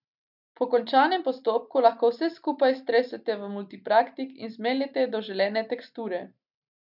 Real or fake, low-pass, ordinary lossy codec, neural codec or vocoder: real; 5.4 kHz; none; none